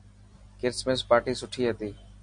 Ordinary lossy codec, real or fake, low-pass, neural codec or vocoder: MP3, 48 kbps; real; 9.9 kHz; none